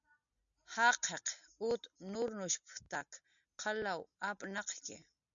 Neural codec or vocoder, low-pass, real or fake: none; 7.2 kHz; real